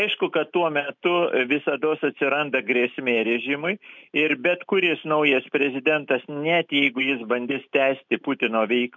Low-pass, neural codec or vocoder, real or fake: 7.2 kHz; none; real